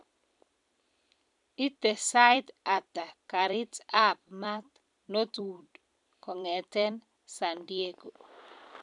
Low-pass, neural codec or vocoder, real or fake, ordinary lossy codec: 10.8 kHz; vocoder, 44.1 kHz, 128 mel bands every 256 samples, BigVGAN v2; fake; none